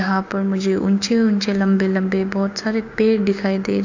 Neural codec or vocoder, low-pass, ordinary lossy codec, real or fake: codec, 16 kHz, 6 kbps, DAC; 7.2 kHz; AAC, 48 kbps; fake